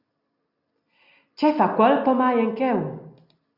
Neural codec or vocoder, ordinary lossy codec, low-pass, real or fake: none; Opus, 64 kbps; 5.4 kHz; real